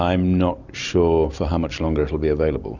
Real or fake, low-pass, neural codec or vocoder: real; 7.2 kHz; none